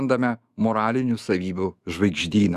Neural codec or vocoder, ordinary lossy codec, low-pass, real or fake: autoencoder, 48 kHz, 128 numbers a frame, DAC-VAE, trained on Japanese speech; Opus, 64 kbps; 14.4 kHz; fake